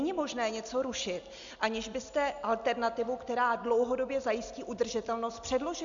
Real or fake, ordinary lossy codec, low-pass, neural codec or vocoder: real; AAC, 64 kbps; 7.2 kHz; none